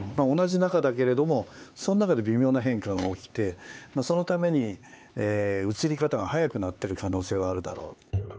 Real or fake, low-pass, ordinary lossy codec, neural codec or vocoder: fake; none; none; codec, 16 kHz, 4 kbps, X-Codec, HuBERT features, trained on LibriSpeech